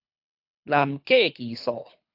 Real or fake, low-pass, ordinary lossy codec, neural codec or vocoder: fake; 5.4 kHz; AAC, 48 kbps; codec, 24 kHz, 3 kbps, HILCodec